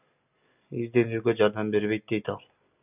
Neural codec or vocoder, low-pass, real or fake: none; 3.6 kHz; real